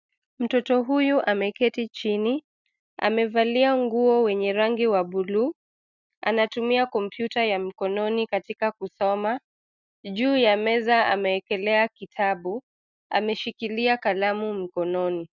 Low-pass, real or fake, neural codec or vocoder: 7.2 kHz; real; none